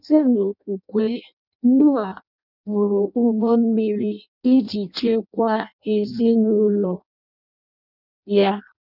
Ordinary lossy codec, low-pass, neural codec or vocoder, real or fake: none; 5.4 kHz; codec, 16 kHz in and 24 kHz out, 0.6 kbps, FireRedTTS-2 codec; fake